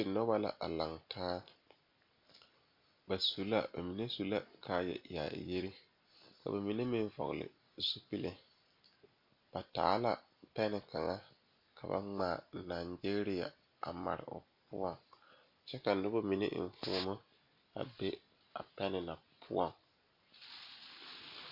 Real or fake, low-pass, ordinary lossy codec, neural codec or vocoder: real; 5.4 kHz; MP3, 48 kbps; none